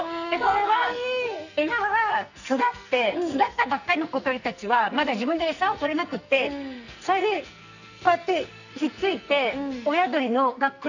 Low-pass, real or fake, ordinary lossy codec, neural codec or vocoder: 7.2 kHz; fake; none; codec, 44.1 kHz, 2.6 kbps, SNAC